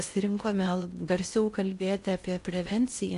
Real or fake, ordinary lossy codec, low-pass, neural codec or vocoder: fake; AAC, 64 kbps; 10.8 kHz; codec, 16 kHz in and 24 kHz out, 0.6 kbps, FocalCodec, streaming, 4096 codes